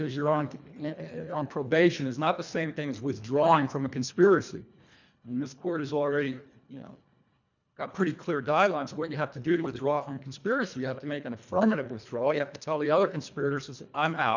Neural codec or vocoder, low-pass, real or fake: codec, 24 kHz, 1.5 kbps, HILCodec; 7.2 kHz; fake